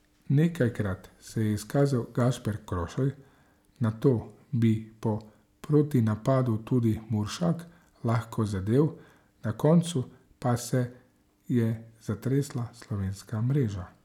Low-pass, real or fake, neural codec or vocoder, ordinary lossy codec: 19.8 kHz; real; none; none